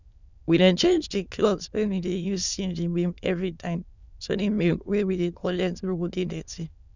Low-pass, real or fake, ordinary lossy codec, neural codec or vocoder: 7.2 kHz; fake; none; autoencoder, 22.05 kHz, a latent of 192 numbers a frame, VITS, trained on many speakers